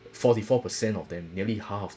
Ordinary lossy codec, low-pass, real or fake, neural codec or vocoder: none; none; real; none